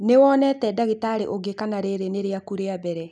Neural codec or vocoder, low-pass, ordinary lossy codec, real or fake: none; none; none; real